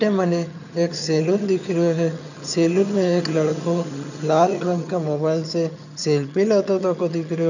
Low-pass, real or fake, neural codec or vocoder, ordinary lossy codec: 7.2 kHz; fake; vocoder, 22.05 kHz, 80 mel bands, HiFi-GAN; none